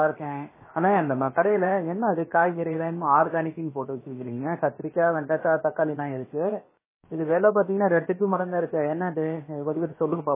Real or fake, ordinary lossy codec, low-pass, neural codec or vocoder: fake; MP3, 16 kbps; 3.6 kHz; codec, 16 kHz, 0.7 kbps, FocalCodec